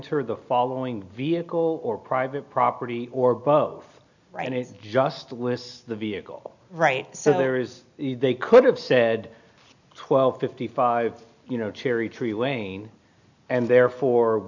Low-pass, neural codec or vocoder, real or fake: 7.2 kHz; none; real